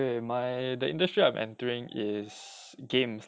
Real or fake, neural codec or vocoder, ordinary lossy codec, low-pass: real; none; none; none